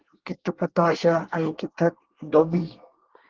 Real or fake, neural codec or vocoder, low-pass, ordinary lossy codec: fake; codec, 24 kHz, 1 kbps, SNAC; 7.2 kHz; Opus, 16 kbps